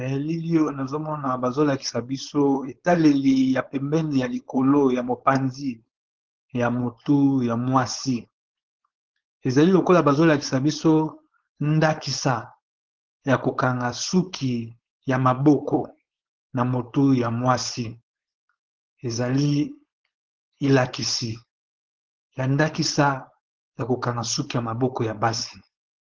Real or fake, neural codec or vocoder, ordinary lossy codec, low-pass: fake; codec, 16 kHz, 4.8 kbps, FACodec; Opus, 16 kbps; 7.2 kHz